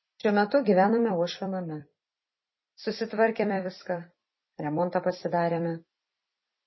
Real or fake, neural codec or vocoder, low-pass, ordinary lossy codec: fake; vocoder, 44.1 kHz, 128 mel bands every 256 samples, BigVGAN v2; 7.2 kHz; MP3, 24 kbps